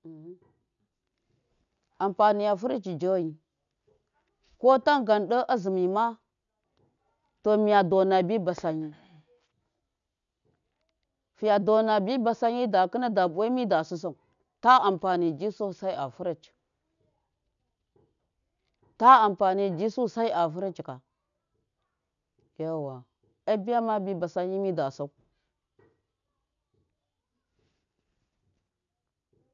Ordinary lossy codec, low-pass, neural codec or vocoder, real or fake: none; 7.2 kHz; none; real